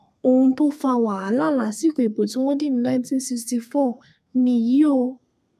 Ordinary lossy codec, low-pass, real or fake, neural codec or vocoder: none; 14.4 kHz; fake; codec, 32 kHz, 1.9 kbps, SNAC